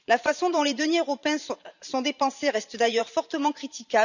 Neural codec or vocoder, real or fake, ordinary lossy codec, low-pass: vocoder, 44.1 kHz, 128 mel bands every 512 samples, BigVGAN v2; fake; none; 7.2 kHz